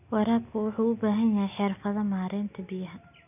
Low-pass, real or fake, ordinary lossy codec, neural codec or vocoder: 3.6 kHz; real; none; none